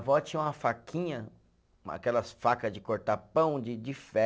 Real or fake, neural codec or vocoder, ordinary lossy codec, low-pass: real; none; none; none